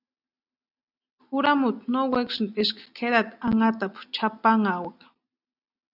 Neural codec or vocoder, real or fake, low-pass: none; real; 5.4 kHz